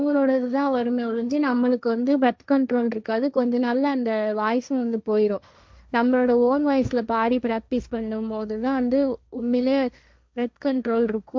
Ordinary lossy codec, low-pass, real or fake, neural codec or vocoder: none; none; fake; codec, 16 kHz, 1.1 kbps, Voila-Tokenizer